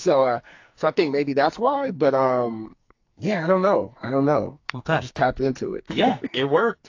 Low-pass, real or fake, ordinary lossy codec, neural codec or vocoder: 7.2 kHz; fake; AAC, 48 kbps; codec, 44.1 kHz, 2.6 kbps, SNAC